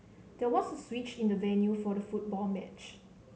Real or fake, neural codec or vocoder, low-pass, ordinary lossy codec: real; none; none; none